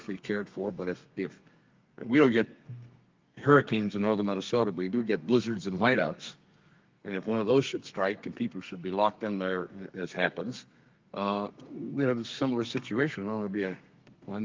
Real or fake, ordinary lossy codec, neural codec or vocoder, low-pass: fake; Opus, 32 kbps; codec, 32 kHz, 1.9 kbps, SNAC; 7.2 kHz